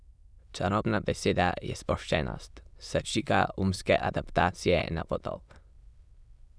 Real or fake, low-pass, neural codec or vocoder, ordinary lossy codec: fake; none; autoencoder, 22.05 kHz, a latent of 192 numbers a frame, VITS, trained on many speakers; none